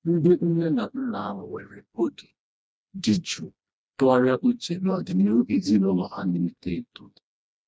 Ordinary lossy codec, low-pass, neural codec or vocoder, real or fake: none; none; codec, 16 kHz, 1 kbps, FreqCodec, smaller model; fake